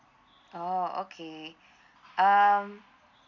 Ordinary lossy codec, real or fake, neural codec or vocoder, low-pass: none; real; none; 7.2 kHz